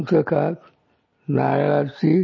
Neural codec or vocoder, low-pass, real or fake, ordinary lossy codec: none; 7.2 kHz; real; MP3, 32 kbps